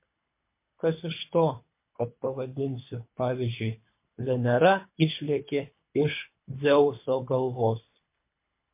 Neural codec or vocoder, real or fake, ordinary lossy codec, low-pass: codec, 24 kHz, 3 kbps, HILCodec; fake; MP3, 24 kbps; 3.6 kHz